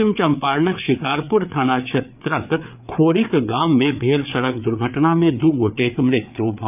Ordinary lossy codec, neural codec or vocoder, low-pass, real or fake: none; codec, 16 kHz, 4 kbps, FreqCodec, larger model; 3.6 kHz; fake